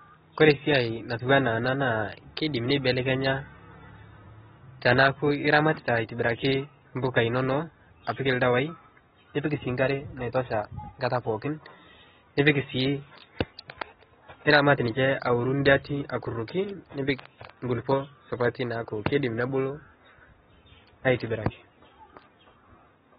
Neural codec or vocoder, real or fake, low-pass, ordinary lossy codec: none; real; 7.2 kHz; AAC, 16 kbps